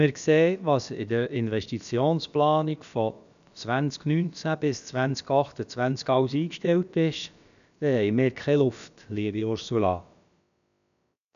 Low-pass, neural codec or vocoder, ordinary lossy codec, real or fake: 7.2 kHz; codec, 16 kHz, about 1 kbps, DyCAST, with the encoder's durations; none; fake